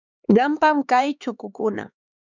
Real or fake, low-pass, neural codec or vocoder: fake; 7.2 kHz; codec, 16 kHz, 4 kbps, X-Codec, HuBERT features, trained on LibriSpeech